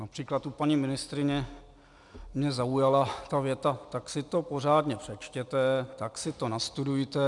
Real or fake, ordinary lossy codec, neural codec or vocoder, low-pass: real; MP3, 96 kbps; none; 10.8 kHz